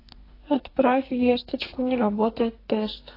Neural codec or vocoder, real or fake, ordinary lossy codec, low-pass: codec, 44.1 kHz, 2.6 kbps, SNAC; fake; AAC, 24 kbps; 5.4 kHz